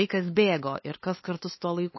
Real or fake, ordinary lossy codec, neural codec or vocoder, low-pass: fake; MP3, 24 kbps; codec, 16 kHz, 8 kbps, FunCodec, trained on LibriTTS, 25 frames a second; 7.2 kHz